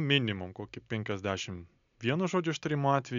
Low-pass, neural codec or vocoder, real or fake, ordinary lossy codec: 7.2 kHz; none; real; MP3, 96 kbps